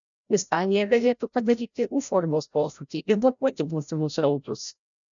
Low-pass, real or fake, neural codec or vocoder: 7.2 kHz; fake; codec, 16 kHz, 0.5 kbps, FreqCodec, larger model